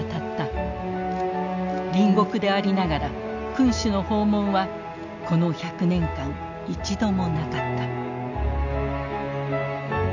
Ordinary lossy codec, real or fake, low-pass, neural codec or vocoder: none; real; 7.2 kHz; none